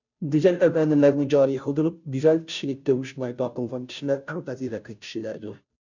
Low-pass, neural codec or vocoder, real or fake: 7.2 kHz; codec, 16 kHz, 0.5 kbps, FunCodec, trained on Chinese and English, 25 frames a second; fake